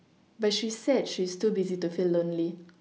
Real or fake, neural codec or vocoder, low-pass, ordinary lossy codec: real; none; none; none